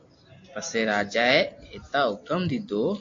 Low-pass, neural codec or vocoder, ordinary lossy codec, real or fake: 7.2 kHz; none; AAC, 48 kbps; real